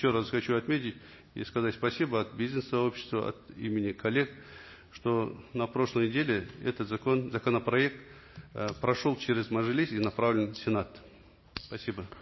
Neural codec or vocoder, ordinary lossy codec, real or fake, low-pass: none; MP3, 24 kbps; real; 7.2 kHz